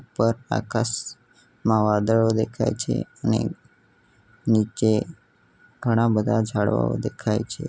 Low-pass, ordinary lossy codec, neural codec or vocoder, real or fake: none; none; none; real